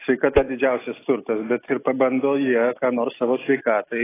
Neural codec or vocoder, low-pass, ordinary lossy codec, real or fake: none; 3.6 kHz; AAC, 16 kbps; real